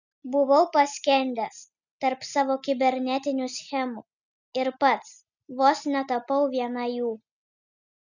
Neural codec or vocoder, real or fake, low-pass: none; real; 7.2 kHz